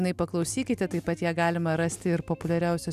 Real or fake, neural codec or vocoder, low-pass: real; none; 14.4 kHz